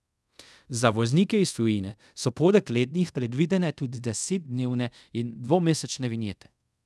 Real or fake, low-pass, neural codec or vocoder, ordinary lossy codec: fake; none; codec, 24 kHz, 0.5 kbps, DualCodec; none